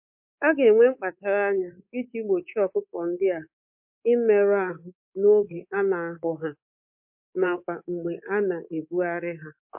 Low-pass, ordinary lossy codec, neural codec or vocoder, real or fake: 3.6 kHz; MP3, 32 kbps; codec, 24 kHz, 3.1 kbps, DualCodec; fake